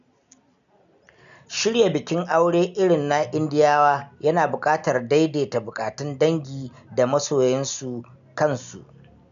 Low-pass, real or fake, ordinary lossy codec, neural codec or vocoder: 7.2 kHz; real; none; none